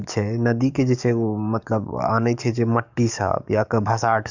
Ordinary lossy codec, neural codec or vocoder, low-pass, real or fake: none; codec, 44.1 kHz, 7.8 kbps, DAC; 7.2 kHz; fake